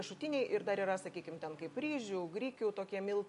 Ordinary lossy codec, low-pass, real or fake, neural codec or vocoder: AAC, 96 kbps; 10.8 kHz; real; none